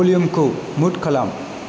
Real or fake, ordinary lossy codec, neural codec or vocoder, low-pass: real; none; none; none